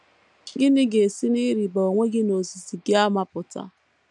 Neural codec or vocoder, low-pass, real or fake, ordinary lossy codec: none; 10.8 kHz; real; none